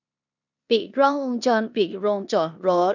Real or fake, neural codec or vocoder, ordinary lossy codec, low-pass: fake; codec, 16 kHz in and 24 kHz out, 0.9 kbps, LongCat-Audio-Codec, four codebook decoder; none; 7.2 kHz